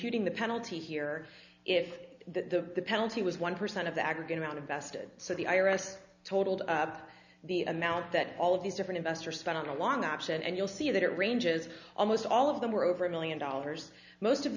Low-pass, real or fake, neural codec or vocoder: 7.2 kHz; real; none